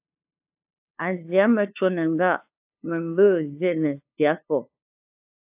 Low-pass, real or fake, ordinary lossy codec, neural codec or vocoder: 3.6 kHz; fake; AAC, 32 kbps; codec, 16 kHz, 2 kbps, FunCodec, trained on LibriTTS, 25 frames a second